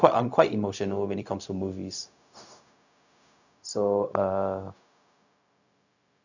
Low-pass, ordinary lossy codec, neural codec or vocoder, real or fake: 7.2 kHz; none; codec, 16 kHz, 0.4 kbps, LongCat-Audio-Codec; fake